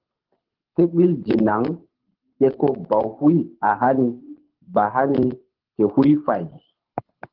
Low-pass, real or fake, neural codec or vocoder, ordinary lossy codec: 5.4 kHz; fake; codec, 24 kHz, 6 kbps, HILCodec; Opus, 16 kbps